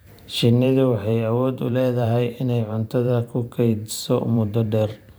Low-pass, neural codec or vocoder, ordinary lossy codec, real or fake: none; vocoder, 44.1 kHz, 128 mel bands every 512 samples, BigVGAN v2; none; fake